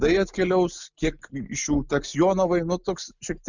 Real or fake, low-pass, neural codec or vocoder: real; 7.2 kHz; none